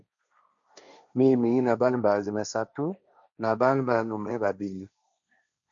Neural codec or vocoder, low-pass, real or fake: codec, 16 kHz, 1.1 kbps, Voila-Tokenizer; 7.2 kHz; fake